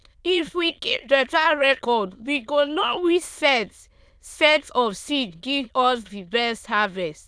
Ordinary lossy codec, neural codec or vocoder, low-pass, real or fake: none; autoencoder, 22.05 kHz, a latent of 192 numbers a frame, VITS, trained on many speakers; none; fake